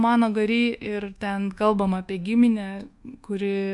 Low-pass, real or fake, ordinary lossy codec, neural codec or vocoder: 10.8 kHz; fake; MP3, 64 kbps; codec, 24 kHz, 1.2 kbps, DualCodec